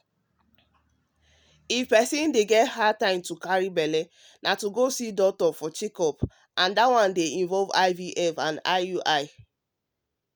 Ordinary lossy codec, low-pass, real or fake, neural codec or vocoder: none; none; real; none